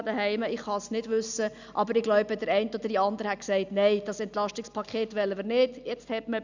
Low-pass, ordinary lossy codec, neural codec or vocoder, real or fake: 7.2 kHz; none; none; real